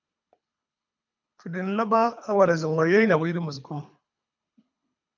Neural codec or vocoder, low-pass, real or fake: codec, 24 kHz, 3 kbps, HILCodec; 7.2 kHz; fake